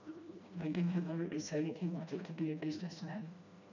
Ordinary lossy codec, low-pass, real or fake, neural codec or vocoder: none; 7.2 kHz; fake; codec, 16 kHz, 1 kbps, FreqCodec, smaller model